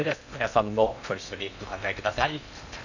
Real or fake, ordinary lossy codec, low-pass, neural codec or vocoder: fake; none; 7.2 kHz; codec, 16 kHz in and 24 kHz out, 0.6 kbps, FocalCodec, streaming, 2048 codes